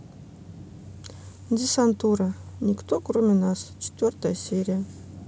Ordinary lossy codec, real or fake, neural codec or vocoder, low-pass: none; real; none; none